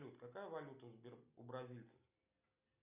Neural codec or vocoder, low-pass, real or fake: none; 3.6 kHz; real